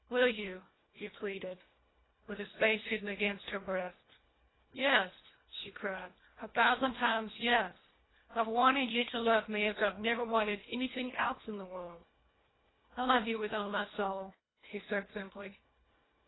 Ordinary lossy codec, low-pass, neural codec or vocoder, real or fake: AAC, 16 kbps; 7.2 kHz; codec, 24 kHz, 1.5 kbps, HILCodec; fake